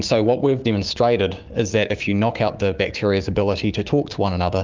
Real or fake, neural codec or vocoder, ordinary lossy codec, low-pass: fake; codec, 16 kHz, 6 kbps, DAC; Opus, 24 kbps; 7.2 kHz